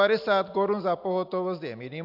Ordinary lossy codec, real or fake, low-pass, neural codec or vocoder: AAC, 48 kbps; real; 5.4 kHz; none